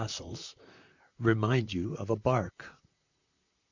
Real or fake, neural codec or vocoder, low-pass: fake; vocoder, 44.1 kHz, 128 mel bands, Pupu-Vocoder; 7.2 kHz